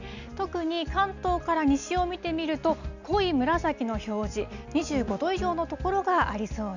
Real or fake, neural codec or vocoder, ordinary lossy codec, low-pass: real; none; none; 7.2 kHz